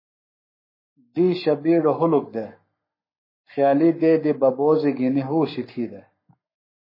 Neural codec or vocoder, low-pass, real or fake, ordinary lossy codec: codec, 44.1 kHz, 7.8 kbps, Pupu-Codec; 5.4 kHz; fake; MP3, 24 kbps